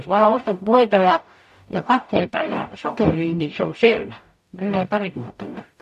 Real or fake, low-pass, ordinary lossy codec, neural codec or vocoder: fake; 14.4 kHz; none; codec, 44.1 kHz, 0.9 kbps, DAC